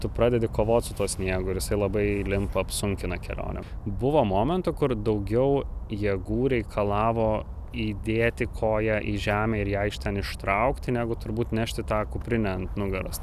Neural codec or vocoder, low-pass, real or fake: none; 14.4 kHz; real